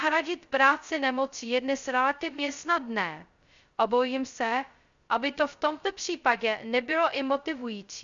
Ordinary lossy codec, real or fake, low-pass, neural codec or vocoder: Opus, 64 kbps; fake; 7.2 kHz; codec, 16 kHz, 0.2 kbps, FocalCodec